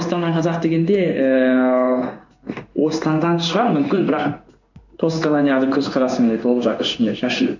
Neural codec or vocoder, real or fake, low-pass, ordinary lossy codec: codec, 16 kHz in and 24 kHz out, 1 kbps, XY-Tokenizer; fake; 7.2 kHz; none